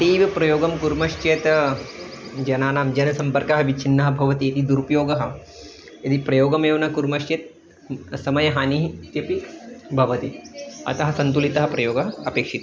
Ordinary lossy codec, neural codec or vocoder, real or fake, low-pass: none; none; real; none